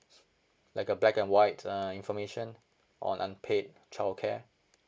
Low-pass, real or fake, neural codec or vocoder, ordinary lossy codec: none; real; none; none